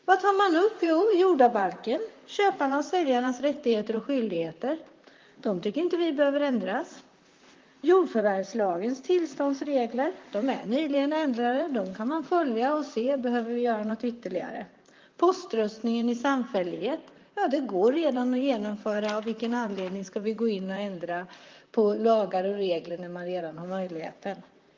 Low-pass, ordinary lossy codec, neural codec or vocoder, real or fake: 7.2 kHz; Opus, 32 kbps; vocoder, 44.1 kHz, 128 mel bands, Pupu-Vocoder; fake